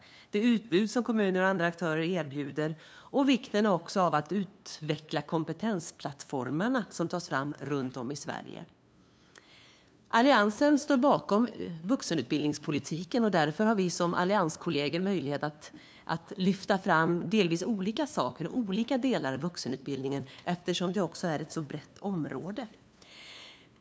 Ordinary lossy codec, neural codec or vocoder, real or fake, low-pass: none; codec, 16 kHz, 2 kbps, FunCodec, trained on LibriTTS, 25 frames a second; fake; none